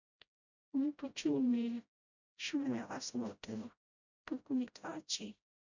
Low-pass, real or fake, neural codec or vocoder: 7.2 kHz; fake; codec, 16 kHz, 0.5 kbps, FreqCodec, smaller model